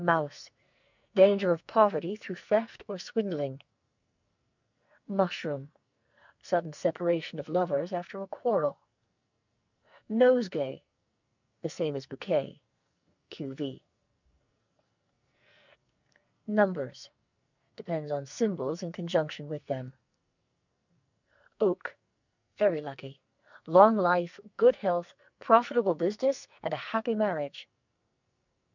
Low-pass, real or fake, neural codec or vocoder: 7.2 kHz; fake; codec, 44.1 kHz, 2.6 kbps, SNAC